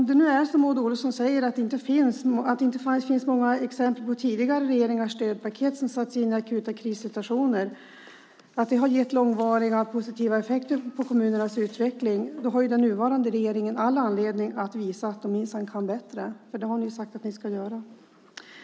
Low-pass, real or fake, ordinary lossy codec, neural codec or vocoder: none; real; none; none